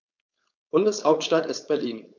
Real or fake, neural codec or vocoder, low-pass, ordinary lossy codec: fake; codec, 16 kHz, 4.8 kbps, FACodec; 7.2 kHz; none